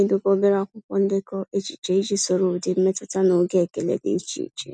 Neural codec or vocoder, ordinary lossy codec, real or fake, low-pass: none; none; real; none